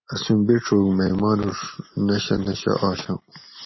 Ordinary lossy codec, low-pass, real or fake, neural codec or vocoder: MP3, 24 kbps; 7.2 kHz; real; none